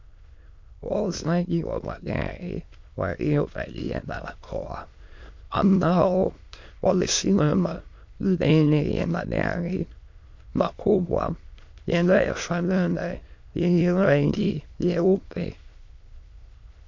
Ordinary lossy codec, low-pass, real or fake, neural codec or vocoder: MP3, 48 kbps; 7.2 kHz; fake; autoencoder, 22.05 kHz, a latent of 192 numbers a frame, VITS, trained on many speakers